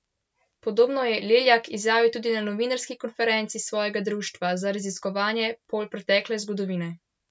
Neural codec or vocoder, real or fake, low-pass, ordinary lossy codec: none; real; none; none